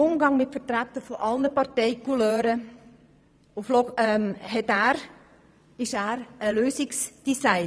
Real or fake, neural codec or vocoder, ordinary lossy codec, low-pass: fake; vocoder, 22.05 kHz, 80 mel bands, Vocos; none; none